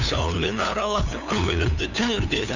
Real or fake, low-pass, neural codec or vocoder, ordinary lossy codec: fake; 7.2 kHz; codec, 16 kHz, 2 kbps, FunCodec, trained on LibriTTS, 25 frames a second; none